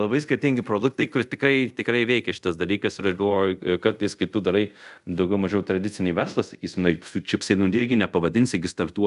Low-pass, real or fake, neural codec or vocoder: 10.8 kHz; fake; codec, 24 kHz, 0.5 kbps, DualCodec